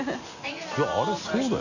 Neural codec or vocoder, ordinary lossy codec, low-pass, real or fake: none; none; 7.2 kHz; real